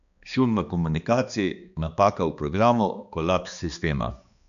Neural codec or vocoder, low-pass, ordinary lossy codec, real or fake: codec, 16 kHz, 2 kbps, X-Codec, HuBERT features, trained on balanced general audio; 7.2 kHz; none; fake